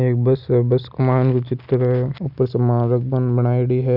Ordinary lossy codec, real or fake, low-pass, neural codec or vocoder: none; real; 5.4 kHz; none